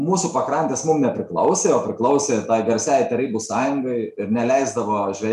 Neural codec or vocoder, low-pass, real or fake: none; 14.4 kHz; real